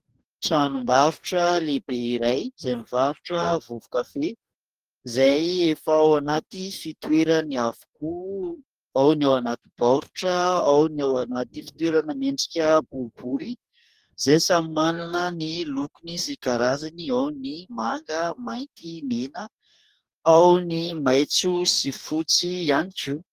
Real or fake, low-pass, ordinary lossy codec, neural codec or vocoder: fake; 14.4 kHz; Opus, 24 kbps; codec, 44.1 kHz, 2.6 kbps, DAC